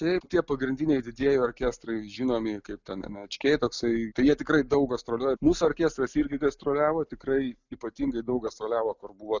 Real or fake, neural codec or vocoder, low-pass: real; none; 7.2 kHz